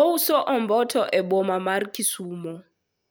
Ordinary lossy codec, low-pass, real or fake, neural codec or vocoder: none; none; real; none